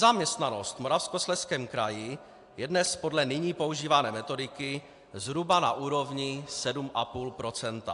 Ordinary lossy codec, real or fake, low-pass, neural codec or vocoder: AAC, 64 kbps; real; 10.8 kHz; none